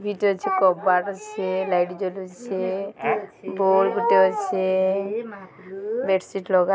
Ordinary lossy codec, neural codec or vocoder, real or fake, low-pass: none; none; real; none